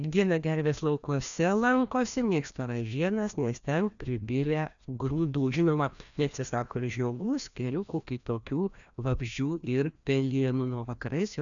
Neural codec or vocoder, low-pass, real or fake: codec, 16 kHz, 1 kbps, FreqCodec, larger model; 7.2 kHz; fake